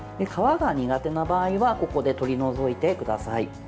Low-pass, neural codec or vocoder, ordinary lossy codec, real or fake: none; none; none; real